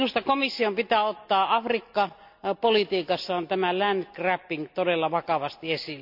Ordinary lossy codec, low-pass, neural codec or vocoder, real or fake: none; 5.4 kHz; none; real